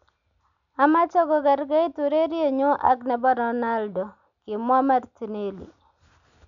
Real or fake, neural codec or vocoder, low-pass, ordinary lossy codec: real; none; 7.2 kHz; none